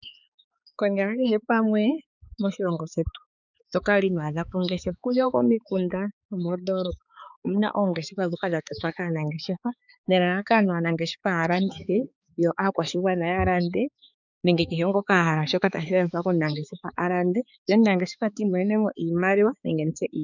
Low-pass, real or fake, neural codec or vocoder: 7.2 kHz; fake; codec, 16 kHz, 4 kbps, X-Codec, HuBERT features, trained on balanced general audio